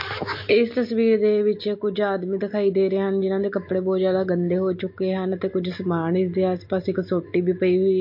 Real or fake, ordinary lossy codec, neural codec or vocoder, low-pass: real; MP3, 32 kbps; none; 5.4 kHz